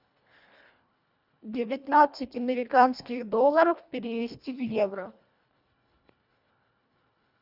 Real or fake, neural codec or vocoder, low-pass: fake; codec, 24 kHz, 1.5 kbps, HILCodec; 5.4 kHz